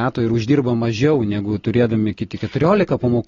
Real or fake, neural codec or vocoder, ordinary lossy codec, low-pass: real; none; AAC, 32 kbps; 7.2 kHz